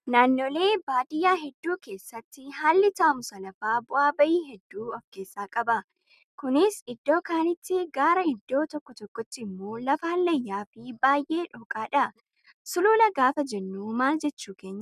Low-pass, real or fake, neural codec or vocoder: 14.4 kHz; real; none